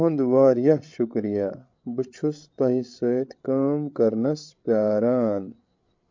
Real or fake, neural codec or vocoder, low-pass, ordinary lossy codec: fake; codec, 16 kHz, 8 kbps, FreqCodec, larger model; 7.2 kHz; MP3, 48 kbps